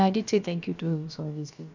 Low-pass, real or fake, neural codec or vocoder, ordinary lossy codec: 7.2 kHz; fake; codec, 16 kHz, about 1 kbps, DyCAST, with the encoder's durations; none